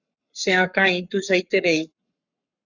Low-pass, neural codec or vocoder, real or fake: 7.2 kHz; codec, 44.1 kHz, 7.8 kbps, Pupu-Codec; fake